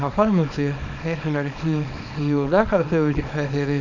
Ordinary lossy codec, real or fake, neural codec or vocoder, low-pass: none; fake; codec, 24 kHz, 0.9 kbps, WavTokenizer, small release; 7.2 kHz